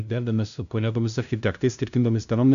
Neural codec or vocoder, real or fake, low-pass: codec, 16 kHz, 0.5 kbps, FunCodec, trained on LibriTTS, 25 frames a second; fake; 7.2 kHz